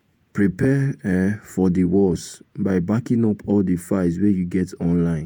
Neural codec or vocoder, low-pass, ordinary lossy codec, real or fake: vocoder, 48 kHz, 128 mel bands, Vocos; none; none; fake